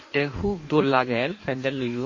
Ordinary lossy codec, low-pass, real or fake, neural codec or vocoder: MP3, 32 kbps; 7.2 kHz; fake; codec, 16 kHz in and 24 kHz out, 1.1 kbps, FireRedTTS-2 codec